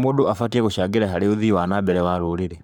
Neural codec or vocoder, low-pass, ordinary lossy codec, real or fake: codec, 44.1 kHz, 7.8 kbps, Pupu-Codec; none; none; fake